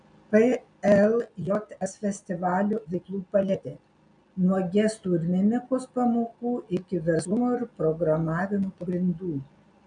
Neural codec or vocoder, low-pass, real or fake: none; 9.9 kHz; real